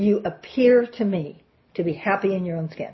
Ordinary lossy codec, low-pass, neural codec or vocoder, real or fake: MP3, 24 kbps; 7.2 kHz; none; real